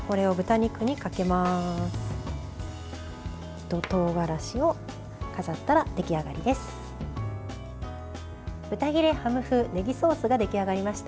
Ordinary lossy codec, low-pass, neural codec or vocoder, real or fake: none; none; none; real